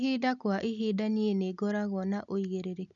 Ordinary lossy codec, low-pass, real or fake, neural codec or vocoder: none; 7.2 kHz; real; none